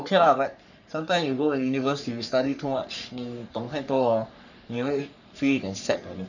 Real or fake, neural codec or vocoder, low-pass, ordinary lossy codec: fake; codec, 44.1 kHz, 3.4 kbps, Pupu-Codec; 7.2 kHz; none